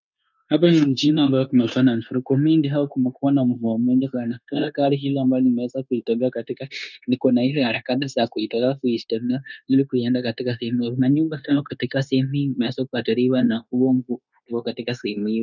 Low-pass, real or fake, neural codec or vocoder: 7.2 kHz; fake; codec, 16 kHz, 0.9 kbps, LongCat-Audio-Codec